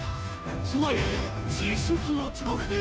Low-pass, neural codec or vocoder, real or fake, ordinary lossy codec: none; codec, 16 kHz, 0.5 kbps, FunCodec, trained on Chinese and English, 25 frames a second; fake; none